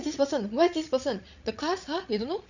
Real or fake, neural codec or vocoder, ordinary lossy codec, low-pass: fake; codec, 16 kHz, 16 kbps, FreqCodec, larger model; none; 7.2 kHz